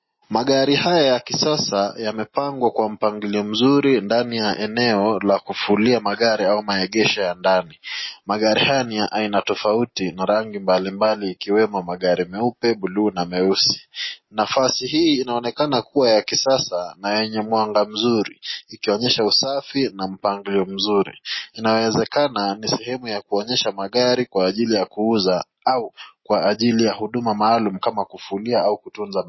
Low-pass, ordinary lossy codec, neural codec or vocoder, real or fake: 7.2 kHz; MP3, 24 kbps; none; real